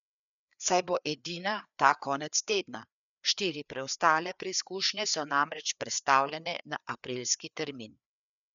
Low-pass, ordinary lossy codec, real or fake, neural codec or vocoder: 7.2 kHz; none; fake; codec, 16 kHz, 4 kbps, FreqCodec, larger model